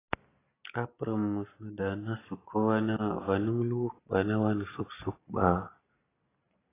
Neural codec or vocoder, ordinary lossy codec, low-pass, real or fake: none; AAC, 16 kbps; 3.6 kHz; real